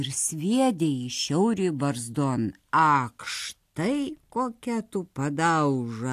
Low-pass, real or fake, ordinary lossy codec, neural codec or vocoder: 14.4 kHz; real; AAC, 64 kbps; none